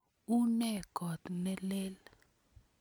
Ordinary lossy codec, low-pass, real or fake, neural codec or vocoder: none; none; real; none